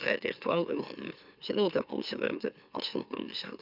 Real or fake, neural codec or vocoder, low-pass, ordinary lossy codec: fake; autoencoder, 44.1 kHz, a latent of 192 numbers a frame, MeloTTS; 5.4 kHz; none